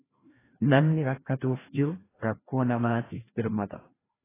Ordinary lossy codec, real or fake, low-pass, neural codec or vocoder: AAC, 16 kbps; fake; 3.6 kHz; codec, 16 kHz, 1 kbps, FreqCodec, larger model